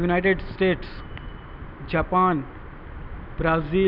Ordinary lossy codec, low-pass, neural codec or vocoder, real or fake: none; 5.4 kHz; none; real